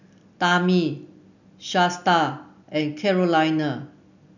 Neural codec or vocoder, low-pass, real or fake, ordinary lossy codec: none; 7.2 kHz; real; none